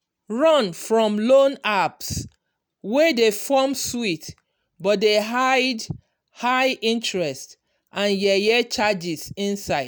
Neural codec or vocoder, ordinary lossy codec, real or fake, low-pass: none; none; real; none